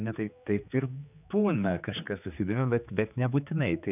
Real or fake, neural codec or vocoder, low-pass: fake; codec, 16 kHz, 4 kbps, X-Codec, HuBERT features, trained on general audio; 3.6 kHz